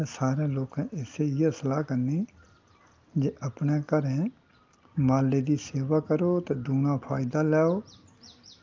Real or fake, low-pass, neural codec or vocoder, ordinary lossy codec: real; 7.2 kHz; none; Opus, 24 kbps